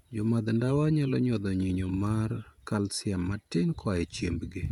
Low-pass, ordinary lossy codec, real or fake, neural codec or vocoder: 19.8 kHz; none; fake; vocoder, 48 kHz, 128 mel bands, Vocos